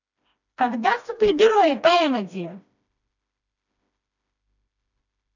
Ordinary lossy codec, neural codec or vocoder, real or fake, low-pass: none; codec, 16 kHz, 1 kbps, FreqCodec, smaller model; fake; 7.2 kHz